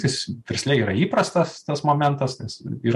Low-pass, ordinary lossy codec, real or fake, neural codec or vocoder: 14.4 kHz; MP3, 64 kbps; real; none